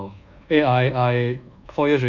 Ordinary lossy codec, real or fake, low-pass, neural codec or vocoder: none; fake; 7.2 kHz; codec, 24 kHz, 1.2 kbps, DualCodec